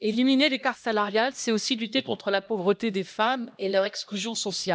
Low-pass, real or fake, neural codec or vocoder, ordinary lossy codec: none; fake; codec, 16 kHz, 1 kbps, X-Codec, HuBERT features, trained on LibriSpeech; none